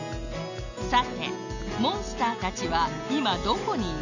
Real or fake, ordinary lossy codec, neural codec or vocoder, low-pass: real; none; none; 7.2 kHz